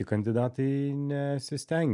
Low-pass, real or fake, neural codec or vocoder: 10.8 kHz; real; none